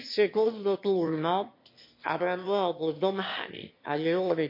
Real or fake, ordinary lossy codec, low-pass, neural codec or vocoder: fake; MP3, 32 kbps; 5.4 kHz; autoencoder, 22.05 kHz, a latent of 192 numbers a frame, VITS, trained on one speaker